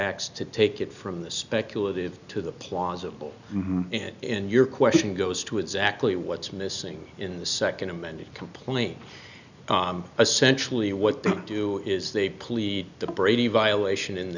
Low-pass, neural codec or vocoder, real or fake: 7.2 kHz; none; real